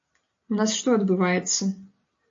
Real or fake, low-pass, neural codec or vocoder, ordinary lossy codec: real; 7.2 kHz; none; AAC, 48 kbps